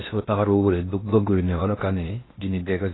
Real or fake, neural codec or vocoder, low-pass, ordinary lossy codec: fake; codec, 16 kHz in and 24 kHz out, 0.6 kbps, FocalCodec, streaming, 2048 codes; 7.2 kHz; AAC, 16 kbps